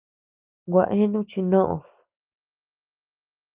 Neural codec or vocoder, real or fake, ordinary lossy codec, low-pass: none; real; Opus, 32 kbps; 3.6 kHz